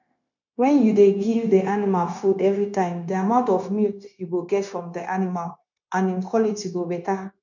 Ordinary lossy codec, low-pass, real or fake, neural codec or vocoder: none; 7.2 kHz; fake; codec, 16 kHz, 0.9 kbps, LongCat-Audio-Codec